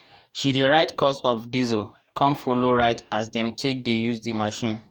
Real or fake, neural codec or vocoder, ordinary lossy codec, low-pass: fake; codec, 44.1 kHz, 2.6 kbps, DAC; Opus, 64 kbps; 19.8 kHz